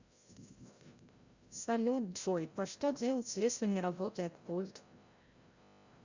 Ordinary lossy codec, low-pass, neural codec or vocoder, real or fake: Opus, 64 kbps; 7.2 kHz; codec, 16 kHz, 0.5 kbps, FreqCodec, larger model; fake